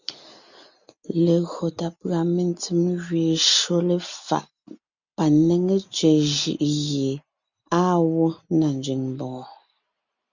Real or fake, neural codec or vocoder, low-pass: real; none; 7.2 kHz